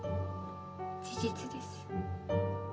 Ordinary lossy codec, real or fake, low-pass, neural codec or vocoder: none; real; none; none